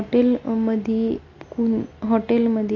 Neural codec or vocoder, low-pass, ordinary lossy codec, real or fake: none; 7.2 kHz; AAC, 32 kbps; real